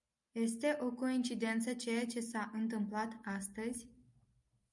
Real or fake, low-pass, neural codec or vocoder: real; 10.8 kHz; none